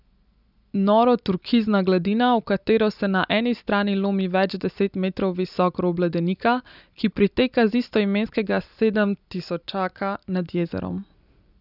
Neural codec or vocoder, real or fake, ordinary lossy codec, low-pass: none; real; none; 5.4 kHz